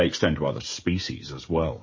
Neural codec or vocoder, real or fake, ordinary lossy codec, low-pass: codec, 16 kHz, 16 kbps, FreqCodec, smaller model; fake; MP3, 32 kbps; 7.2 kHz